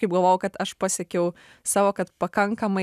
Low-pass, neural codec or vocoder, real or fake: 14.4 kHz; none; real